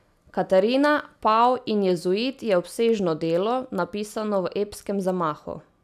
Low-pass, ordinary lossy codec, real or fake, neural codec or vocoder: 14.4 kHz; none; real; none